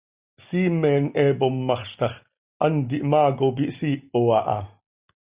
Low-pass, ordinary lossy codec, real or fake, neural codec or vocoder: 3.6 kHz; Opus, 64 kbps; real; none